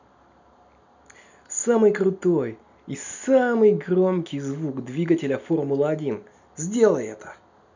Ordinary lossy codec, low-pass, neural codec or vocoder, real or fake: none; 7.2 kHz; none; real